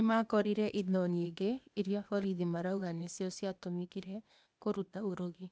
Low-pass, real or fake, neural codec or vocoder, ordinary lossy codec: none; fake; codec, 16 kHz, 0.8 kbps, ZipCodec; none